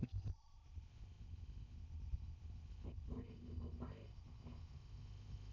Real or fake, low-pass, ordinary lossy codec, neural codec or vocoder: fake; 7.2 kHz; none; codec, 16 kHz in and 24 kHz out, 0.6 kbps, FocalCodec, streaming, 4096 codes